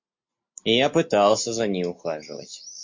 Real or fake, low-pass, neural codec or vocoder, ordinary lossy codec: real; 7.2 kHz; none; MP3, 48 kbps